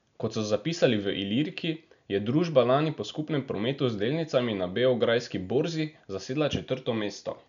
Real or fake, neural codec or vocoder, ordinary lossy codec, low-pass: real; none; none; 7.2 kHz